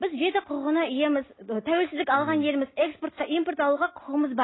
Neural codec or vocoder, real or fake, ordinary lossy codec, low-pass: none; real; AAC, 16 kbps; 7.2 kHz